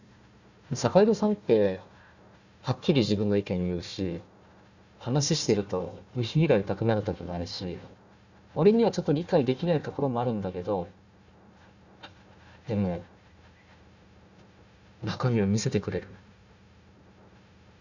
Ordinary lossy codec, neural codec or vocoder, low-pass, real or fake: none; codec, 16 kHz, 1 kbps, FunCodec, trained on Chinese and English, 50 frames a second; 7.2 kHz; fake